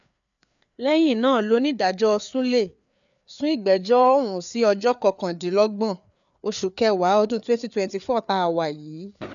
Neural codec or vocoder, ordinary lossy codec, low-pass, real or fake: codec, 16 kHz, 4 kbps, FreqCodec, larger model; none; 7.2 kHz; fake